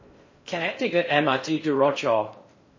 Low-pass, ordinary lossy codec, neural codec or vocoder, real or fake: 7.2 kHz; MP3, 32 kbps; codec, 16 kHz in and 24 kHz out, 0.6 kbps, FocalCodec, streaming, 4096 codes; fake